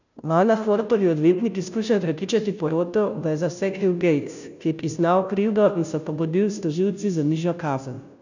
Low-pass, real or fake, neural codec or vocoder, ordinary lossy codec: 7.2 kHz; fake; codec, 16 kHz, 0.5 kbps, FunCodec, trained on Chinese and English, 25 frames a second; none